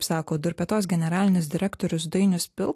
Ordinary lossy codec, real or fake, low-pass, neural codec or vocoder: AAC, 64 kbps; real; 14.4 kHz; none